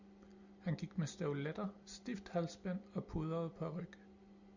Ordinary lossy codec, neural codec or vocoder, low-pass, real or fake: Opus, 64 kbps; none; 7.2 kHz; real